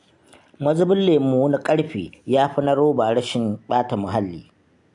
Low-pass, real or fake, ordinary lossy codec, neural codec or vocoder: 10.8 kHz; real; AAC, 64 kbps; none